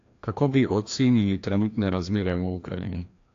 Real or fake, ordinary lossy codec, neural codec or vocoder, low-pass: fake; AAC, 48 kbps; codec, 16 kHz, 1 kbps, FreqCodec, larger model; 7.2 kHz